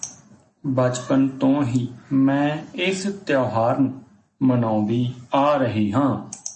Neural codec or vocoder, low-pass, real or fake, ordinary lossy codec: none; 10.8 kHz; real; MP3, 32 kbps